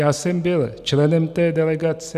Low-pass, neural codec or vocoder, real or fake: 14.4 kHz; none; real